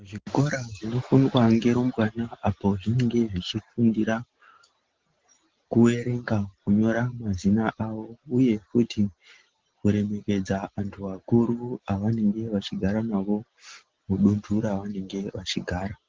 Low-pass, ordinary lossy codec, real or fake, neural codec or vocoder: 7.2 kHz; Opus, 16 kbps; real; none